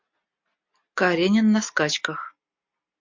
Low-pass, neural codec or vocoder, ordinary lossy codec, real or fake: 7.2 kHz; none; MP3, 64 kbps; real